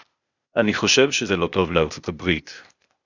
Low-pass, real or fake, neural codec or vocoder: 7.2 kHz; fake; codec, 16 kHz, 0.8 kbps, ZipCodec